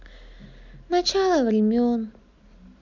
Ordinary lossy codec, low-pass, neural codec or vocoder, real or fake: none; 7.2 kHz; none; real